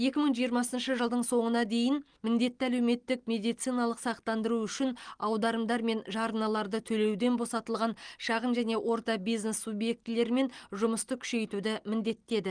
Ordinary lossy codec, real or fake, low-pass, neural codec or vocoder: Opus, 24 kbps; real; 9.9 kHz; none